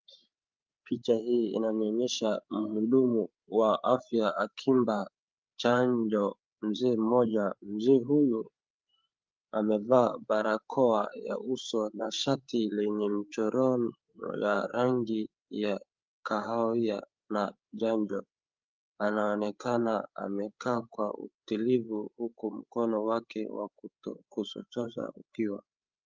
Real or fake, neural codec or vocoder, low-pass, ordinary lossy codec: fake; codec, 16 kHz, 8 kbps, FreqCodec, larger model; 7.2 kHz; Opus, 24 kbps